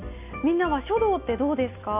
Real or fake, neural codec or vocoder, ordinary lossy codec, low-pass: real; none; none; 3.6 kHz